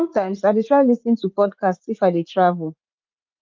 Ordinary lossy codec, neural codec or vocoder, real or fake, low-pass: Opus, 24 kbps; vocoder, 44.1 kHz, 80 mel bands, Vocos; fake; 7.2 kHz